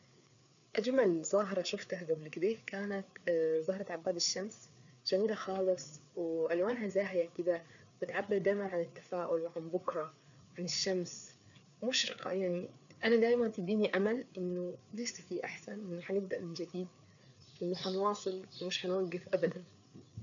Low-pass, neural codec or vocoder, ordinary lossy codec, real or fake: 7.2 kHz; codec, 16 kHz, 4 kbps, FreqCodec, larger model; none; fake